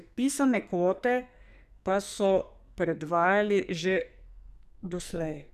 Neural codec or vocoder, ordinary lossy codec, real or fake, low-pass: codec, 44.1 kHz, 2.6 kbps, SNAC; none; fake; 14.4 kHz